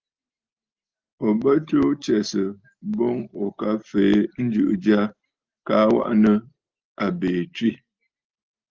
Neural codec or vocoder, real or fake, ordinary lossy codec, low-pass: none; real; Opus, 24 kbps; 7.2 kHz